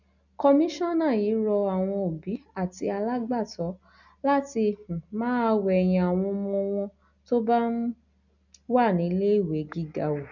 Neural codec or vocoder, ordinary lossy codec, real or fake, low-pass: none; none; real; 7.2 kHz